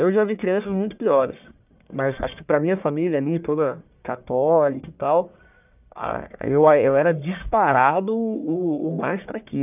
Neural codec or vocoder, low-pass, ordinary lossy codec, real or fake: codec, 44.1 kHz, 1.7 kbps, Pupu-Codec; 3.6 kHz; none; fake